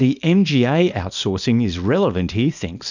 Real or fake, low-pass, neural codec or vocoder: fake; 7.2 kHz; codec, 24 kHz, 0.9 kbps, WavTokenizer, small release